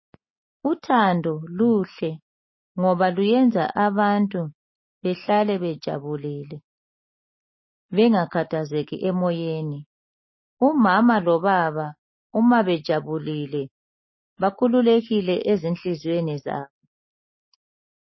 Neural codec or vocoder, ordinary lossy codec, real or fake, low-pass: none; MP3, 24 kbps; real; 7.2 kHz